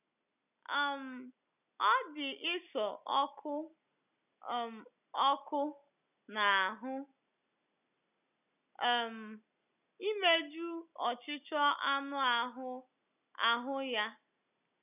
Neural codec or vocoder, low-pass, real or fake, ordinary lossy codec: none; 3.6 kHz; real; none